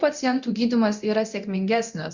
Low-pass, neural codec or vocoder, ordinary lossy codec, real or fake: 7.2 kHz; codec, 24 kHz, 0.9 kbps, DualCodec; Opus, 64 kbps; fake